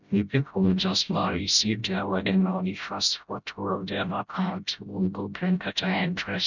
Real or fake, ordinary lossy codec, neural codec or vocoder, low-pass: fake; none; codec, 16 kHz, 0.5 kbps, FreqCodec, smaller model; 7.2 kHz